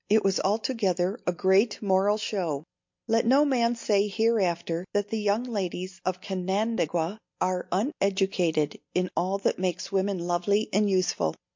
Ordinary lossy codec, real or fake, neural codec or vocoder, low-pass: MP3, 48 kbps; real; none; 7.2 kHz